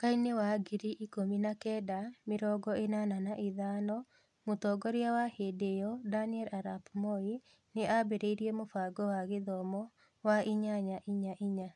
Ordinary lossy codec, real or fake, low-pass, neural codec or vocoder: none; real; 10.8 kHz; none